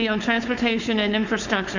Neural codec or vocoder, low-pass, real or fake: codec, 16 kHz, 4.8 kbps, FACodec; 7.2 kHz; fake